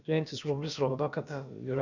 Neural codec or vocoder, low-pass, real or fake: codec, 16 kHz, about 1 kbps, DyCAST, with the encoder's durations; 7.2 kHz; fake